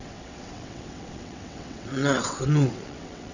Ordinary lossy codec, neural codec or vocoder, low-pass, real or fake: none; vocoder, 22.05 kHz, 80 mel bands, WaveNeXt; 7.2 kHz; fake